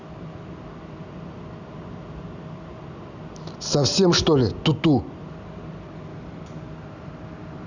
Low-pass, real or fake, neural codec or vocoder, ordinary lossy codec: 7.2 kHz; real; none; none